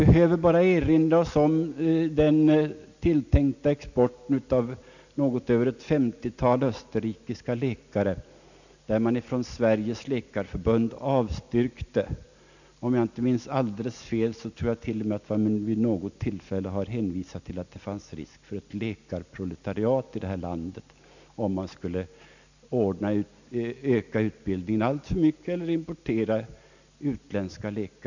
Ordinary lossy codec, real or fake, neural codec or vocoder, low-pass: none; real; none; 7.2 kHz